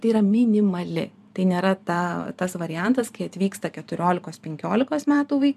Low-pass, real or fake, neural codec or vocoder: 14.4 kHz; fake; vocoder, 44.1 kHz, 128 mel bands every 512 samples, BigVGAN v2